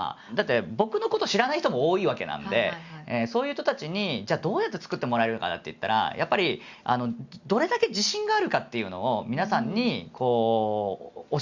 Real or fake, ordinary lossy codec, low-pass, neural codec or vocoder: real; Opus, 64 kbps; 7.2 kHz; none